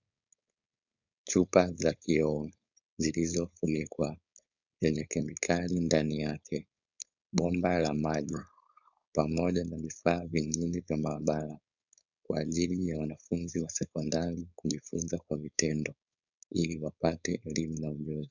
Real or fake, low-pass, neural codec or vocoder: fake; 7.2 kHz; codec, 16 kHz, 4.8 kbps, FACodec